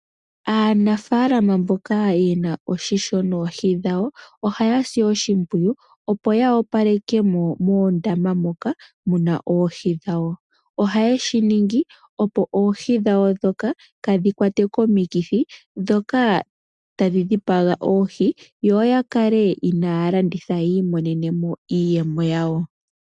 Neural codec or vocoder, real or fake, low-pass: none; real; 10.8 kHz